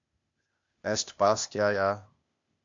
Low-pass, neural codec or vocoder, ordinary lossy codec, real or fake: 7.2 kHz; codec, 16 kHz, 0.8 kbps, ZipCodec; MP3, 48 kbps; fake